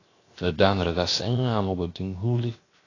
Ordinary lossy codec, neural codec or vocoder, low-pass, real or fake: AAC, 32 kbps; codec, 16 kHz, 0.7 kbps, FocalCodec; 7.2 kHz; fake